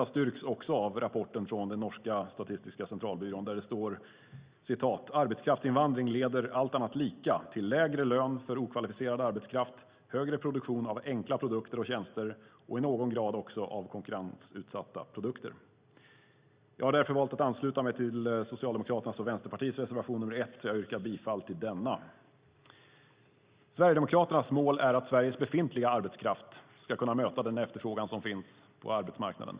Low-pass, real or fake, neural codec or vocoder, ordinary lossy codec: 3.6 kHz; real; none; Opus, 64 kbps